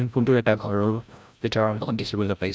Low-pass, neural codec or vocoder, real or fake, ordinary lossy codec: none; codec, 16 kHz, 0.5 kbps, FreqCodec, larger model; fake; none